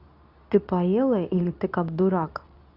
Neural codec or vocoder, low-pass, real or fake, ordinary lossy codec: codec, 24 kHz, 0.9 kbps, WavTokenizer, medium speech release version 2; 5.4 kHz; fake; none